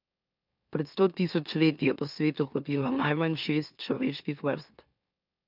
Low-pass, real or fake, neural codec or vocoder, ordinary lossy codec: 5.4 kHz; fake; autoencoder, 44.1 kHz, a latent of 192 numbers a frame, MeloTTS; none